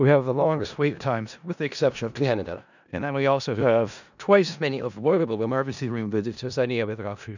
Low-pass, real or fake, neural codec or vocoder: 7.2 kHz; fake; codec, 16 kHz in and 24 kHz out, 0.4 kbps, LongCat-Audio-Codec, four codebook decoder